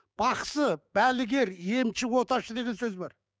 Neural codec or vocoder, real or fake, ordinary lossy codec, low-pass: codec, 16 kHz, 6 kbps, DAC; fake; none; none